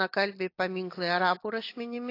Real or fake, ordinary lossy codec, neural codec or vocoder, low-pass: real; AAC, 32 kbps; none; 5.4 kHz